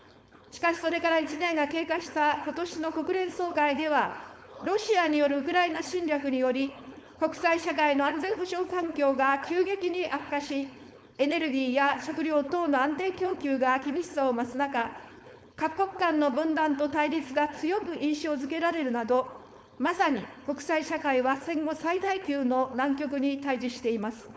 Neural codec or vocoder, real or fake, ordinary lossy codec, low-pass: codec, 16 kHz, 4.8 kbps, FACodec; fake; none; none